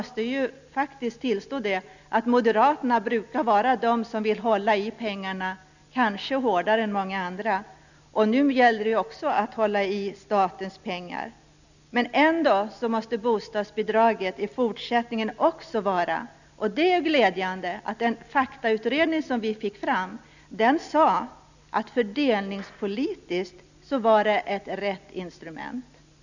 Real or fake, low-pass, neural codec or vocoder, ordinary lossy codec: real; 7.2 kHz; none; none